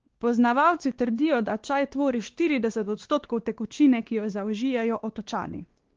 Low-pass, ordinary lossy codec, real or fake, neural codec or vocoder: 7.2 kHz; Opus, 16 kbps; fake; codec, 16 kHz, 2 kbps, X-Codec, WavLM features, trained on Multilingual LibriSpeech